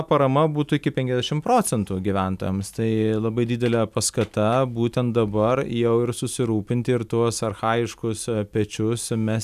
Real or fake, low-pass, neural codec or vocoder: real; 14.4 kHz; none